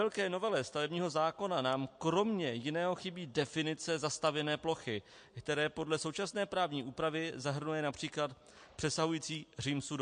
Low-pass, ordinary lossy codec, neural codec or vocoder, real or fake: 9.9 kHz; MP3, 48 kbps; none; real